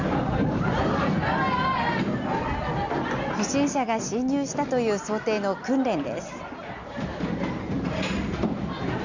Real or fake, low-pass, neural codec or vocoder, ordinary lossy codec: real; 7.2 kHz; none; Opus, 64 kbps